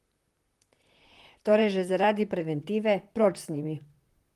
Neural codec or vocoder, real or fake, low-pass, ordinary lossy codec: vocoder, 44.1 kHz, 128 mel bands, Pupu-Vocoder; fake; 14.4 kHz; Opus, 24 kbps